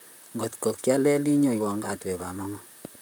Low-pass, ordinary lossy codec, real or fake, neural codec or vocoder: none; none; fake; vocoder, 44.1 kHz, 128 mel bands, Pupu-Vocoder